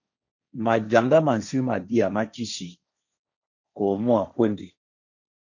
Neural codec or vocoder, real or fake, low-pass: codec, 16 kHz, 1.1 kbps, Voila-Tokenizer; fake; 7.2 kHz